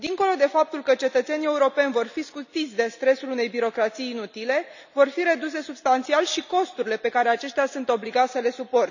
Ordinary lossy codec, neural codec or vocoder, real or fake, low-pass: none; none; real; 7.2 kHz